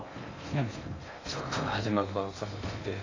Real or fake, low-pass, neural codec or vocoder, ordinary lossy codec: fake; 7.2 kHz; codec, 16 kHz in and 24 kHz out, 0.6 kbps, FocalCodec, streaming, 2048 codes; AAC, 32 kbps